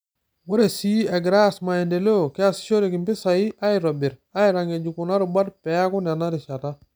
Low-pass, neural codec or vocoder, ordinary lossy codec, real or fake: none; none; none; real